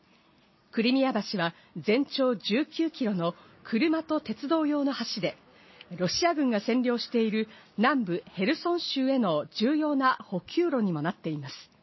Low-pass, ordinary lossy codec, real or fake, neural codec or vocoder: 7.2 kHz; MP3, 24 kbps; real; none